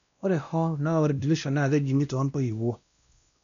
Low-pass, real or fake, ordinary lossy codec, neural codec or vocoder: 7.2 kHz; fake; none; codec, 16 kHz, 1 kbps, X-Codec, WavLM features, trained on Multilingual LibriSpeech